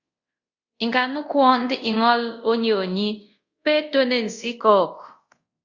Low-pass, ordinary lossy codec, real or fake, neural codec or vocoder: 7.2 kHz; Opus, 64 kbps; fake; codec, 24 kHz, 0.5 kbps, DualCodec